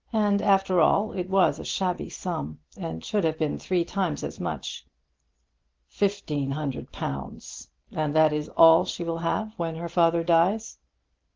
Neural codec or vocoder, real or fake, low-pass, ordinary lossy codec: none; real; 7.2 kHz; Opus, 16 kbps